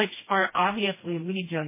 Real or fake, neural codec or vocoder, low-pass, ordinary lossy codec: fake; codec, 16 kHz, 1 kbps, FreqCodec, smaller model; 3.6 kHz; MP3, 16 kbps